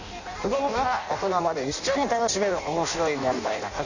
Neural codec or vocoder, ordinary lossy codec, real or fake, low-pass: codec, 16 kHz in and 24 kHz out, 0.6 kbps, FireRedTTS-2 codec; none; fake; 7.2 kHz